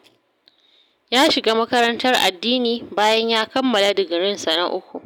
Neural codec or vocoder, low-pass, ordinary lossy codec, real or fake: none; none; none; real